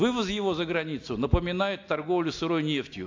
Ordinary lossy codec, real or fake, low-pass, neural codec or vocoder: AAC, 48 kbps; real; 7.2 kHz; none